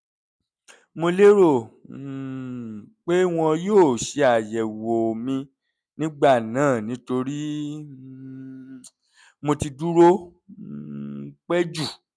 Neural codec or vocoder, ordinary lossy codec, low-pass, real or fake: none; none; none; real